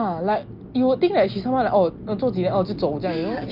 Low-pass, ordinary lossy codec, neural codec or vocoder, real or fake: 5.4 kHz; Opus, 24 kbps; none; real